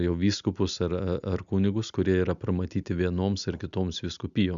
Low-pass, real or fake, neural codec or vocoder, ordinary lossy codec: 7.2 kHz; real; none; MP3, 96 kbps